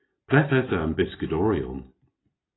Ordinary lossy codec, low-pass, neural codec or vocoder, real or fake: AAC, 16 kbps; 7.2 kHz; none; real